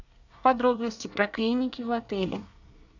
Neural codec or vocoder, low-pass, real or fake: codec, 24 kHz, 1 kbps, SNAC; 7.2 kHz; fake